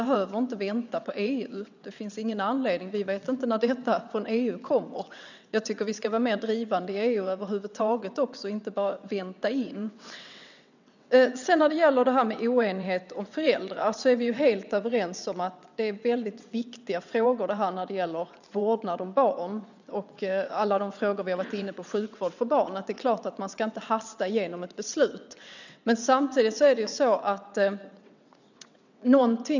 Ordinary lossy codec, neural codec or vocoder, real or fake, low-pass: none; vocoder, 22.05 kHz, 80 mel bands, WaveNeXt; fake; 7.2 kHz